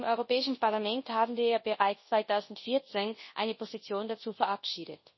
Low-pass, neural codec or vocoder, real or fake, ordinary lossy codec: 7.2 kHz; codec, 24 kHz, 0.9 kbps, WavTokenizer, large speech release; fake; MP3, 24 kbps